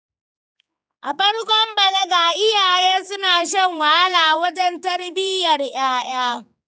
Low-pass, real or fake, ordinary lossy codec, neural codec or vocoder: none; fake; none; codec, 16 kHz, 4 kbps, X-Codec, HuBERT features, trained on general audio